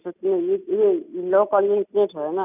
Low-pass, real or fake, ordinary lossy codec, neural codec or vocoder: 3.6 kHz; real; none; none